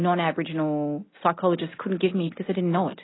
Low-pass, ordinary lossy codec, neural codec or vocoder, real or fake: 7.2 kHz; AAC, 16 kbps; none; real